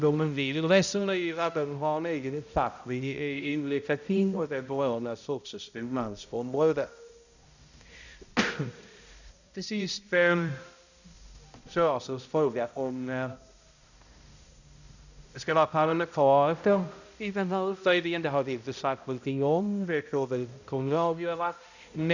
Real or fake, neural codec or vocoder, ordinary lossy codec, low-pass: fake; codec, 16 kHz, 0.5 kbps, X-Codec, HuBERT features, trained on balanced general audio; none; 7.2 kHz